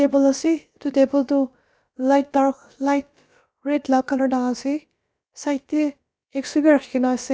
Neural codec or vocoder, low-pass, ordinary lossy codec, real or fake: codec, 16 kHz, about 1 kbps, DyCAST, with the encoder's durations; none; none; fake